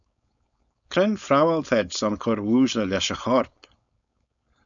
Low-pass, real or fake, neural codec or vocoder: 7.2 kHz; fake; codec, 16 kHz, 4.8 kbps, FACodec